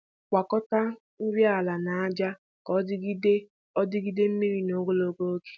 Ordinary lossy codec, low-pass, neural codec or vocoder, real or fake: none; none; none; real